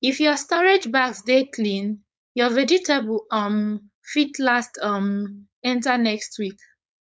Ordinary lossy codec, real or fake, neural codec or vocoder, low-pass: none; fake; codec, 16 kHz, 4.8 kbps, FACodec; none